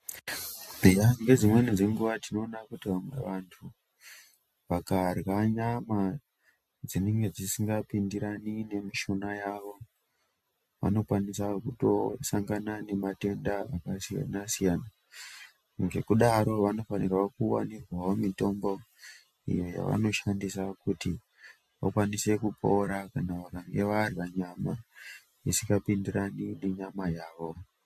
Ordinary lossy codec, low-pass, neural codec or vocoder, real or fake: MP3, 64 kbps; 14.4 kHz; none; real